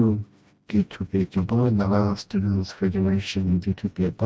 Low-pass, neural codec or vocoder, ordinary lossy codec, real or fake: none; codec, 16 kHz, 1 kbps, FreqCodec, smaller model; none; fake